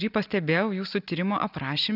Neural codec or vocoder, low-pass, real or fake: none; 5.4 kHz; real